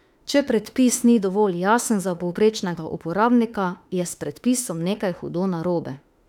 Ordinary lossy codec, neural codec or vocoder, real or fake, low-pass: none; autoencoder, 48 kHz, 32 numbers a frame, DAC-VAE, trained on Japanese speech; fake; 19.8 kHz